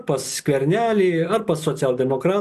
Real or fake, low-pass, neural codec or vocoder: real; 14.4 kHz; none